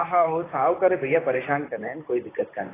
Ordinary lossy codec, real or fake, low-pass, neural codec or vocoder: AAC, 16 kbps; fake; 3.6 kHz; vocoder, 44.1 kHz, 128 mel bands, Pupu-Vocoder